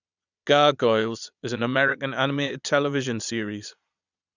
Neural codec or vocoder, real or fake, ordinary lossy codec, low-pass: vocoder, 22.05 kHz, 80 mel bands, Vocos; fake; none; 7.2 kHz